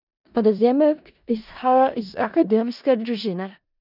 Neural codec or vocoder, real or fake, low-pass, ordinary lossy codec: codec, 16 kHz in and 24 kHz out, 0.4 kbps, LongCat-Audio-Codec, four codebook decoder; fake; 5.4 kHz; none